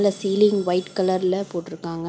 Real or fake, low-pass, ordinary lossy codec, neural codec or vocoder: real; none; none; none